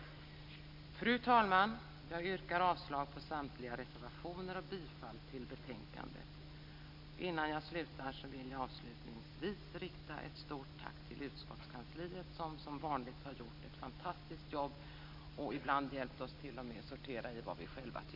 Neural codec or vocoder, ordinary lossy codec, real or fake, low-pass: none; MP3, 48 kbps; real; 5.4 kHz